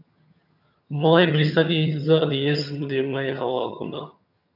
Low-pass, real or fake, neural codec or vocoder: 5.4 kHz; fake; vocoder, 22.05 kHz, 80 mel bands, HiFi-GAN